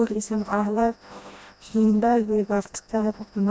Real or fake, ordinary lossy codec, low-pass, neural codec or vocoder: fake; none; none; codec, 16 kHz, 1 kbps, FreqCodec, smaller model